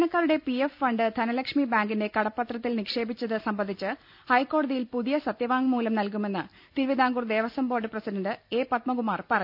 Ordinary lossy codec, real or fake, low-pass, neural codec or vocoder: none; real; 5.4 kHz; none